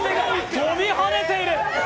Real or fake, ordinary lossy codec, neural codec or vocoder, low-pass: real; none; none; none